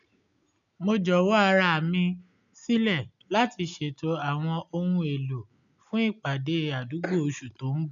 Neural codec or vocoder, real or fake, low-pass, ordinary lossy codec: none; real; 7.2 kHz; none